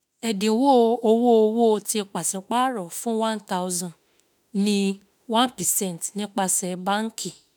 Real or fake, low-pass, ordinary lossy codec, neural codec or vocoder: fake; none; none; autoencoder, 48 kHz, 32 numbers a frame, DAC-VAE, trained on Japanese speech